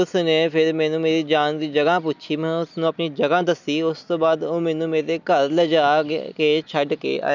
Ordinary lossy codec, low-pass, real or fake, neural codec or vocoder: none; 7.2 kHz; real; none